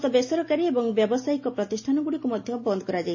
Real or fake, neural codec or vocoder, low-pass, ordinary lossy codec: real; none; 7.2 kHz; MP3, 32 kbps